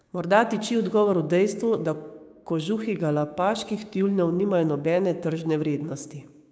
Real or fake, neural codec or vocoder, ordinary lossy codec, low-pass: fake; codec, 16 kHz, 6 kbps, DAC; none; none